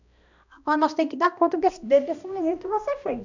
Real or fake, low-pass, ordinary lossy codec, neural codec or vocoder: fake; 7.2 kHz; none; codec, 16 kHz, 1 kbps, X-Codec, HuBERT features, trained on balanced general audio